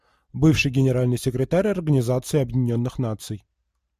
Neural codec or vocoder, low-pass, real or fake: none; 14.4 kHz; real